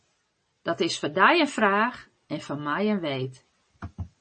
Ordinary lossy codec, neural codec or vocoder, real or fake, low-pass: MP3, 32 kbps; none; real; 10.8 kHz